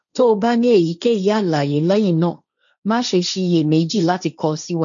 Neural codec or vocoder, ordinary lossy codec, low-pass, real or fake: codec, 16 kHz, 1.1 kbps, Voila-Tokenizer; none; 7.2 kHz; fake